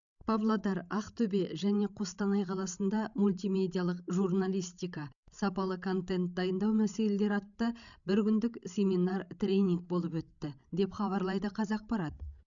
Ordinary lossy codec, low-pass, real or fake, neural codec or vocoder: none; 7.2 kHz; fake; codec, 16 kHz, 16 kbps, FreqCodec, larger model